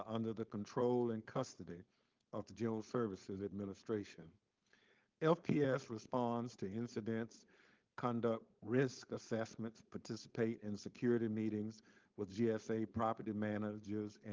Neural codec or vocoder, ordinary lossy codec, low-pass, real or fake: codec, 16 kHz, 4.8 kbps, FACodec; Opus, 32 kbps; 7.2 kHz; fake